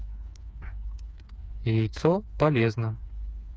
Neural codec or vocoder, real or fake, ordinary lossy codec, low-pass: codec, 16 kHz, 4 kbps, FreqCodec, smaller model; fake; none; none